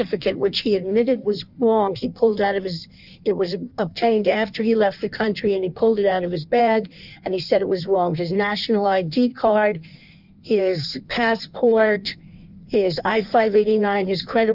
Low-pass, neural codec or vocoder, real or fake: 5.4 kHz; codec, 16 kHz in and 24 kHz out, 1.1 kbps, FireRedTTS-2 codec; fake